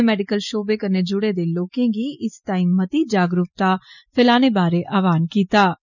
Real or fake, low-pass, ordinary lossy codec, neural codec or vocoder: real; 7.2 kHz; none; none